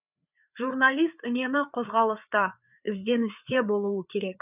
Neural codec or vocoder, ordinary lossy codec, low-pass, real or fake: codec, 16 kHz, 4 kbps, FreqCodec, larger model; none; 3.6 kHz; fake